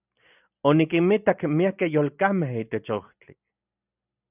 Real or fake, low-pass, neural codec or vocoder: real; 3.6 kHz; none